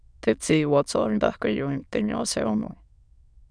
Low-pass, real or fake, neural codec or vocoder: 9.9 kHz; fake; autoencoder, 22.05 kHz, a latent of 192 numbers a frame, VITS, trained on many speakers